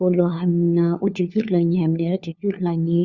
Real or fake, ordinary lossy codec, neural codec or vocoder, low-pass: fake; Opus, 64 kbps; codec, 16 kHz, 4 kbps, FunCodec, trained on LibriTTS, 50 frames a second; 7.2 kHz